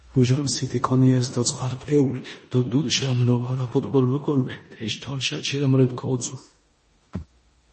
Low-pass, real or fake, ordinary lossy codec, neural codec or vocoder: 10.8 kHz; fake; MP3, 32 kbps; codec, 16 kHz in and 24 kHz out, 0.9 kbps, LongCat-Audio-Codec, four codebook decoder